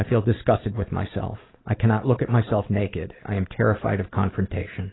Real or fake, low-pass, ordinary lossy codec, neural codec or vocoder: real; 7.2 kHz; AAC, 16 kbps; none